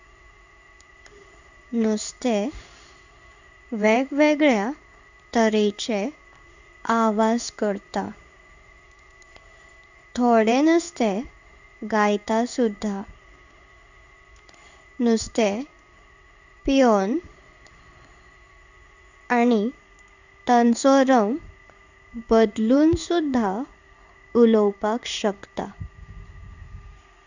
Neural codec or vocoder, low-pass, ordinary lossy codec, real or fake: codec, 16 kHz in and 24 kHz out, 1 kbps, XY-Tokenizer; 7.2 kHz; none; fake